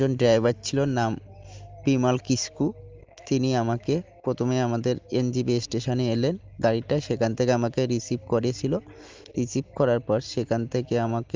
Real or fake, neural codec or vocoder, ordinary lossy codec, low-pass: real; none; Opus, 32 kbps; 7.2 kHz